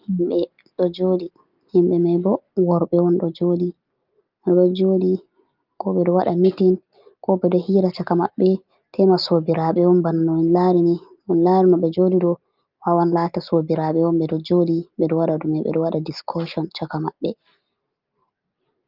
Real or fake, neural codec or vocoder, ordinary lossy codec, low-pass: real; none; Opus, 32 kbps; 5.4 kHz